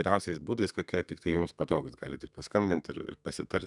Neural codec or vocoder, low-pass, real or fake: codec, 32 kHz, 1.9 kbps, SNAC; 10.8 kHz; fake